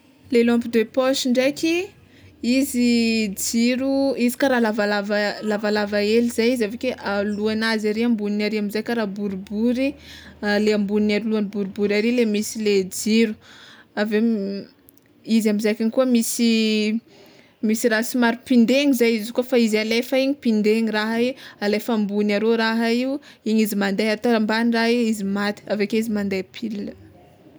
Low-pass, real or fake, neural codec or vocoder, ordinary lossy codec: none; real; none; none